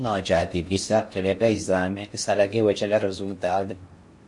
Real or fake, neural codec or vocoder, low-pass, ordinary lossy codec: fake; codec, 16 kHz in and 24 kHz out, 0.6 kbps, FocalCodec, streaming, 4096 codes; 10.8 kHz; MP3, 48 kbps